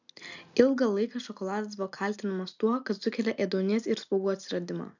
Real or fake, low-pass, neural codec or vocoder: real; 7.2 kHz; none